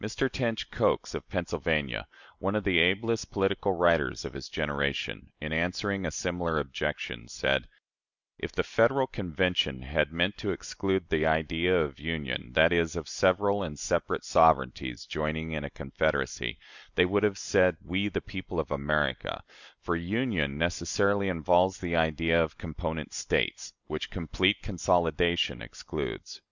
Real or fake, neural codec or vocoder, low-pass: real; none; 7.2 kHz